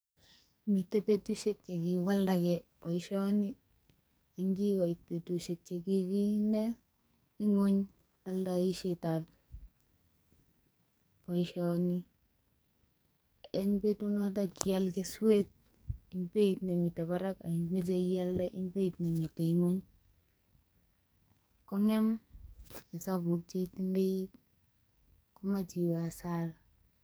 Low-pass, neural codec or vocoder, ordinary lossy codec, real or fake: none; codec, 44.1 kHz, 2.6 kbps, SNAC; none; fake